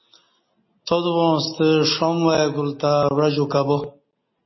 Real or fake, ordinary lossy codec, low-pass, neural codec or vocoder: real; MP3, 24 kbps; 7.2 kHz; none